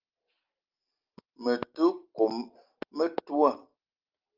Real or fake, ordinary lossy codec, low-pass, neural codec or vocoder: real; Opus, 24 kbps; 5.4 kHz; none